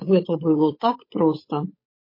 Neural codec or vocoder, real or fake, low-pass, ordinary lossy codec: codec, 16 kHz, 8 kbps, FunCodec, trained on Chinese and English, 25 frames a second; fake; 5.4 kHz; MP3, 24 kbps